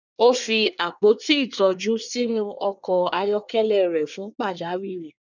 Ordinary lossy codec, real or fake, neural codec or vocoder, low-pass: none; fake; codec, 24 kHz, 1 kbps, SNAC; 7.2 kHz